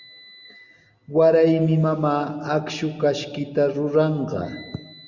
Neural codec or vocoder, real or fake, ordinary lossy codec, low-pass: none; real; Opus, 64 kbps; 7.2 kHz